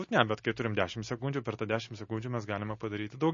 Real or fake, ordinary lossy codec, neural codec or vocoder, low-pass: real; MP3, 32 kbps; none; 7.2 kHz